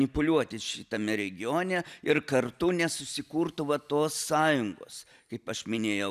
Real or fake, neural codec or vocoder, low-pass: real; none; 14.4 kHz